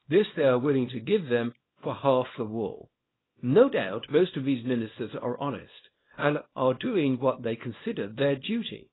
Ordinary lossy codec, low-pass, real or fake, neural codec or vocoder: AAC, 16 kbps; 7.2 kHz; fake; codec, 24 kHz, 0.9 kbps, WavTokenizer, small release